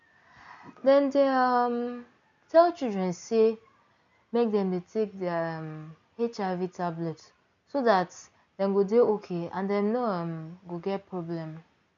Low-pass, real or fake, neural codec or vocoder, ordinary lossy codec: 7.2 kHz; real; none; MP3, 96 kbps